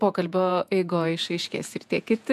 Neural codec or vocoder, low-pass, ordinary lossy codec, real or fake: vocoder, 48 kHz, 128 mel bands, Vocos; 14.4 kHz; MP3, 96 kbps; fake